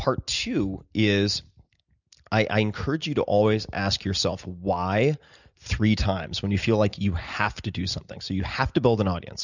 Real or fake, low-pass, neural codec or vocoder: real; 7.2 kHz; none